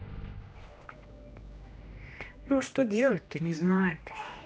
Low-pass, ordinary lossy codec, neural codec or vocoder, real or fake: none; none; codec, 16 kHz, 1 kbps, X-Codec, HuBERT features, trained on general audio; fake